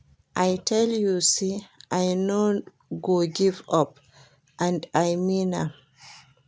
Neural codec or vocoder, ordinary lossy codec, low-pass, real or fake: none; none; none; real